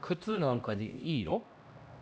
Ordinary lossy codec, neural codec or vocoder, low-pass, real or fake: none; codec, 16 kHz, 1 kbps, X-Codec, HuBERT features, trained on LibriSpeech; none; fake